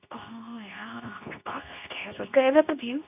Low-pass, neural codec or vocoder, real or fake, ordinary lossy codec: 3.6 kHz; codec, 24 kHz, 0.9 kbps, WavTokenizer, medium speech release version 2; fake; none